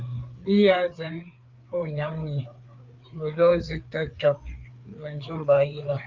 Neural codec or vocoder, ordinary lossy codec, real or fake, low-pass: codec, 16 kHz, 4 kbps, FreqCodec, larger model; Opus, 32 kbps; fake; 7.2 kHz